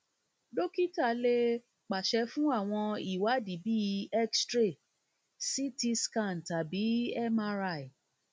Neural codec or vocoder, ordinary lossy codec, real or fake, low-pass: none; none; real; none